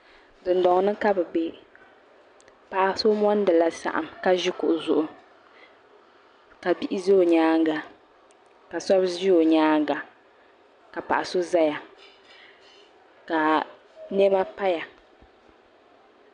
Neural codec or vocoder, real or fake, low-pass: none; real; 9.9 kHz